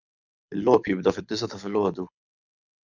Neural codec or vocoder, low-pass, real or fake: codec, 24 kHz, 6 kbps, HILCodec; 7.2 kHz; fake